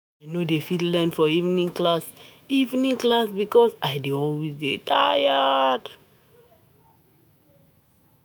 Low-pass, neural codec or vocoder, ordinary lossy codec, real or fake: none; autoencoder, 48 kHz, 128 numbers a frame, DAC-VAE, trained on Japanese speech; none; fake